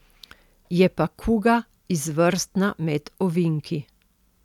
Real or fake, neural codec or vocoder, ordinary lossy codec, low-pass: real; none; none; 19.8 kHz